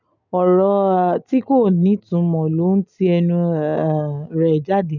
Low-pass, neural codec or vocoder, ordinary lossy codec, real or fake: 7.2 kHz; none; none; real